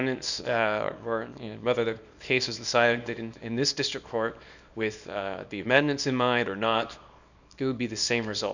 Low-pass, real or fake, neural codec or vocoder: 7.2 kHz; fake; codec, 24 kHz, 0.9 kbps, WavTokenizer, small release